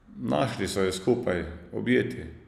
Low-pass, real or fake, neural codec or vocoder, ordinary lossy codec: 14.4 kHz; real; none; none